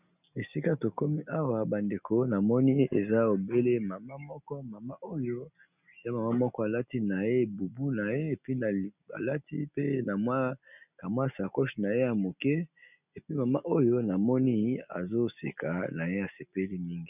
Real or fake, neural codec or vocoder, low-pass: real; none; 3.6 kHz